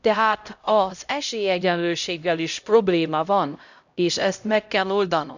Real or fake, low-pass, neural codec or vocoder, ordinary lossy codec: fake; 7.2 kHz; codec, 16 kHz, 0.5 kbps, X-Codec, HuBERT features, trained on LibriSpeech; none